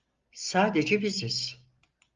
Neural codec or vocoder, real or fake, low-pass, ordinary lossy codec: none; real; 7.2 kHz; Opus, 32 kbps